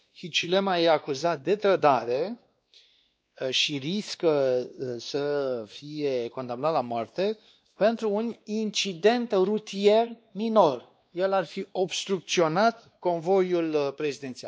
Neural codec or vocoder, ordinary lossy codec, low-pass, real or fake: codec, 16 kHz, 2 kbps, X-Codec, WavLM features, trained on Multilingual LibriSpeech; none; none; fake